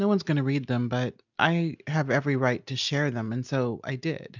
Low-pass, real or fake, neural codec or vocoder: 7.2 kHz; real; none